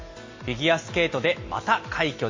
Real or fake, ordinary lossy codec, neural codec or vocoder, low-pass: real; MP3, 32 kbps; none; 7.2 kHz